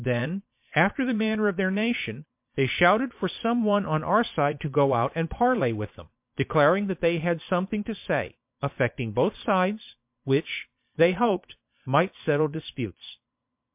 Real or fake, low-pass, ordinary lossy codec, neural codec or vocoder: fake; 3.6 kHz; MP3, 32 kbps; vocoder, 22.05 kHz, 80 mel bands, Vocos